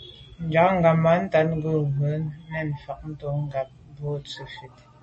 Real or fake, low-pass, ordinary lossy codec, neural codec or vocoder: real; 10.8 kHz; MP3, 32 kbps; none